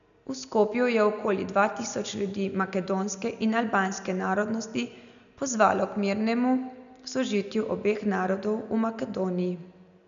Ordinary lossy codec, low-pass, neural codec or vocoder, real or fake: none; 7.2 kHz; none; real